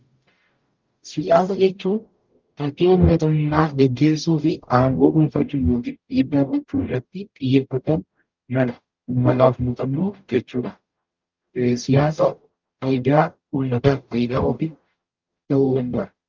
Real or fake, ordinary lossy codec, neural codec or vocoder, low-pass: fake; Opus, 32 kbps; codec, 44.1 kHz, 0.9 kbps, DAC; 7.2 kHz